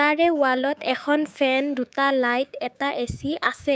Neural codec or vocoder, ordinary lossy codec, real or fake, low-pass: codec, 16 kHz, 6 kbps, DAC; none; fake; none